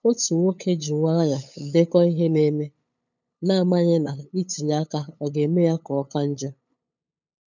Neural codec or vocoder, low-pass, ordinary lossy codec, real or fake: codec, 16 kHz, 8 kbps, FunCodec, trained on LibriTTS, 25 frames a second; 7.2 kHz; none; fake